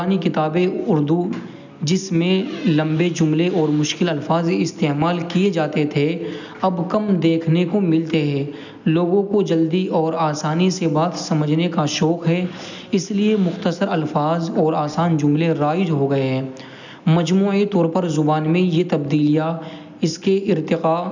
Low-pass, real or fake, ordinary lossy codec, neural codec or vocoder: 7.2 kHz; real; none; none